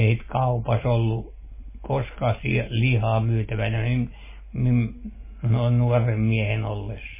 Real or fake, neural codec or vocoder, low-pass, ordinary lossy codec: real; none; 3.6 kHz; MP3, 16 kbps